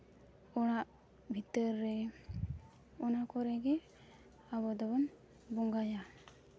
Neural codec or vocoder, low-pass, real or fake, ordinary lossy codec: none; none; real; none